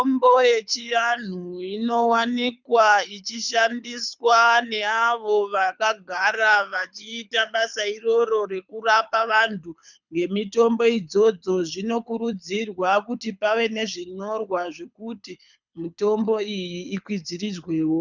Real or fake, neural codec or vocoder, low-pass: fake; codec, 24 kHz, 6 kbps, HILCodec; 7.2 kHz